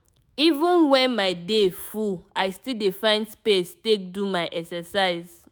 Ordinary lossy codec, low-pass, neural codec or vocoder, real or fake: none; none; autoencoder, 48 kHz, 128 numbers a frame, DAC-VAE, trained on Japanese speech; fake